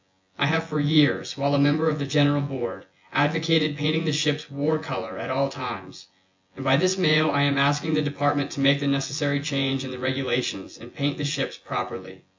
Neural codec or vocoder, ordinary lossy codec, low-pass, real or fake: vocoder, 24 kHz, 100 mel bands, Vocos; MP3, 64 kbps; 7.2 kHz; fake